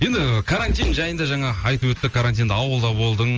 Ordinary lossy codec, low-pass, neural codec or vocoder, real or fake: Opus, 24 kbps; 7.2 kHz; none; real